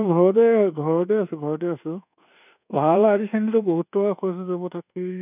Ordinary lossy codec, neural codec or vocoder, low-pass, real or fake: none; codec, 24 kHz, 1.2 kbps, DualCodec; 3.6 kHz; fake